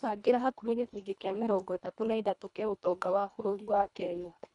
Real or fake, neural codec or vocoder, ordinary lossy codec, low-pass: fake; codec, 24 kHz, 1.5 kbps, HILCodec; none; 10.8 kHz